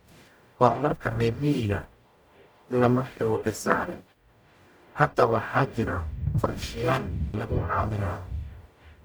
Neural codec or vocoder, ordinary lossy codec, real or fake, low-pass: codec, 44.1 kHz, 0.9 kbps, DAC; none; fake; none